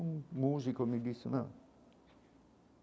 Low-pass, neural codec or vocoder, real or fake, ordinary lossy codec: none; none; real; none